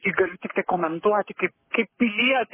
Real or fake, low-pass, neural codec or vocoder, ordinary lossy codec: fake; 3.6 kHz; codec, 44.1 kHz, 7.8 kbps, Pupu-Codec; MP3, 16 kbps